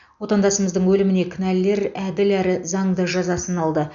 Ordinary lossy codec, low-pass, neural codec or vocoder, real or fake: none; 7.2 kHz; none; real